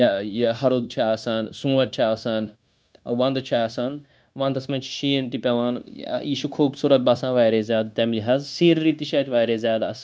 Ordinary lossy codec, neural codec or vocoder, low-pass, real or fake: none; codec, 16 kHz, 0.9 kbps, LongCat-Audio-Codec; none; fake